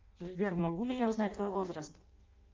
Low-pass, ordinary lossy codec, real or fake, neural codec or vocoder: 7.2 kHz; Opus, 24 kbps; fake; codec, 16 kHz in and 24 kHz out, 0.6 kbps, FireRedTTS-2 codec